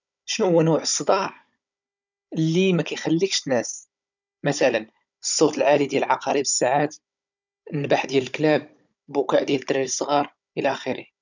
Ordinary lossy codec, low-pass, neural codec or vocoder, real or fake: none; 7.2 kHz; codec, 16 kHz, 16 kbps, FunCodec, trained on Chinese and English, 50 frames a second; fake